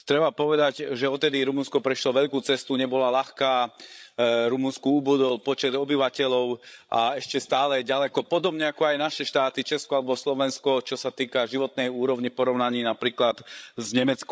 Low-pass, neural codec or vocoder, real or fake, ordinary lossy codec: none; codec, 16 kHz, 16 kbps, FreqCodec, larger model; fake; none